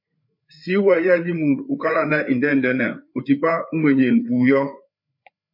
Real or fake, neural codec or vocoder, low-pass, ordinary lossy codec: fake; codec, 16 kHz, 4 kbps, FreqCodec, larger model; 5.4 kHz; MP3, 32 kbps